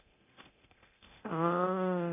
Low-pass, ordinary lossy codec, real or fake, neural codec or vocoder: 3.6 kHz; none; fake; codec, 24 kHz, 0.9 kbps, DualCodec